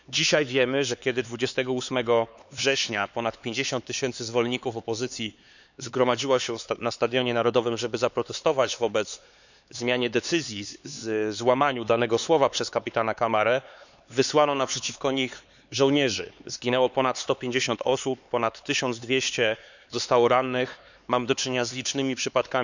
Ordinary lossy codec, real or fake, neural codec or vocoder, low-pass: none; fake; codec, 16 kHz, 4 kbps, X-Codec, HuBERT features, trained on LibriSpeech; 7.2 kHz